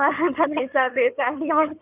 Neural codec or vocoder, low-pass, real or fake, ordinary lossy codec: codec, 16 kHz in and 24 kHz out, 2.2 kbps, FireRedTTS-2 codec; 3.6 kHz; fake; none